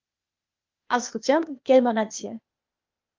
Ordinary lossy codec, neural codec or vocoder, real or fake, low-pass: Opus, 32 kbps; codec, 16 kHz, 0.8 kbps, ZipCodec; fake; 7.2 kHz